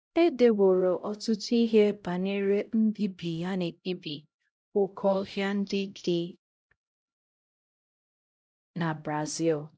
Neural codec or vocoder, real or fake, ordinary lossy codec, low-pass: codec, 16 kHz, 0.5 kbps, X-Codec, HuBERT features, trained on LibriSpeech; fake; none; none